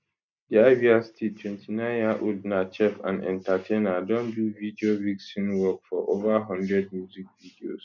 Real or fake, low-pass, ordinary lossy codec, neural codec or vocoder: real; 7.2 kHz; none; none